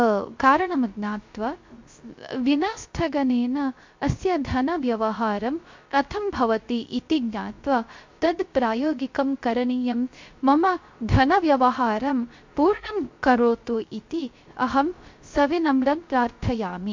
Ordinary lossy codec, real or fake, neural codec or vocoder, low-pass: MP3, 48 kbps; fake; codec, 16 kHz, 0.3 kbps, FocalCodec; 7.2 kHz